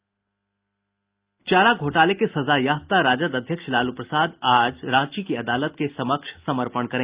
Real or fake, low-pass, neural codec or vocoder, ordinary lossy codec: real; 3.6 kHz; none; Opus, 32 kbps